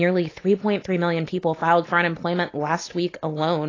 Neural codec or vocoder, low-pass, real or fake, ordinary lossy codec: none; 7.2 kHz; real; AAC, 32 kbps